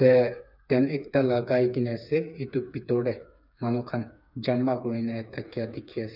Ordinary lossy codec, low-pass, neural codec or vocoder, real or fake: none; 5.4 kHz; codec, 16 kHz, 4 kbps, FreqCodec, smaller model; fake